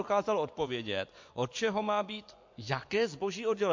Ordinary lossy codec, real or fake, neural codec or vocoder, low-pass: MP3, 48 kbps; fake; vocoder, 24 kHz, 100 mel bands, Vocos; 7.2 kHz